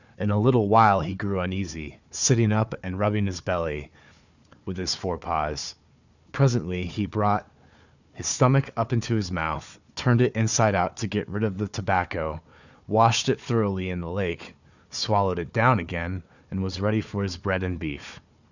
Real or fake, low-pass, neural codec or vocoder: fake; 7.2 kHz; codec, 16 kHz, 4 kbps, FunCodec, trained on Chinese and English, 50 frames a second